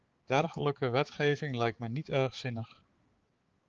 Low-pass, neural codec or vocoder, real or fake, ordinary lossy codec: 7.2 kHz; codec, 16 kHz, 4 kbps, X-Codec, HuBERT features, trained on balanced general audio; fake; Opus, 16 kbps